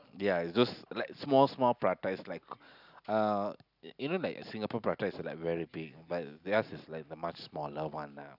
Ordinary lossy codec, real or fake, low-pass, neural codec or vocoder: none; real; 5.4 kHz; none